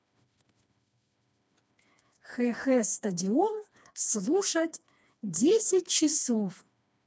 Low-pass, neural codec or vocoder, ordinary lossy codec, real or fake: none; codec, 16 kHz, 2 kbps, FreqCodec, smaller model; none; fake